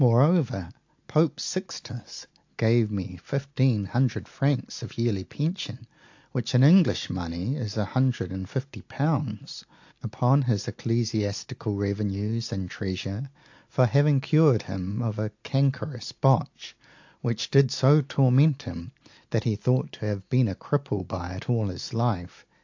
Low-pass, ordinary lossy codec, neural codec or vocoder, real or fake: 7.2 kHz; AAC, 48 kbps; none; real